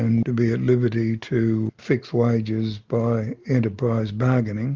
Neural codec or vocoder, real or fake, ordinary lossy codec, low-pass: none; real; Opus, 32 kbps; 7.2 kHz